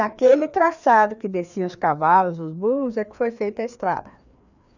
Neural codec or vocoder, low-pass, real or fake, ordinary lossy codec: codec, 16 kHz, 2 kbps, FreqCodec, larger model; 7.2 kHz; fake; none